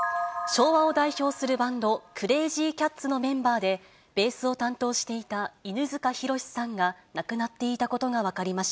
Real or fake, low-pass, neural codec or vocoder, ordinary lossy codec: real; none; none; none